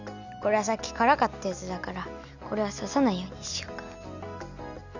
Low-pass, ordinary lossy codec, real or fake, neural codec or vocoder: 7.2 kHz; none; real; none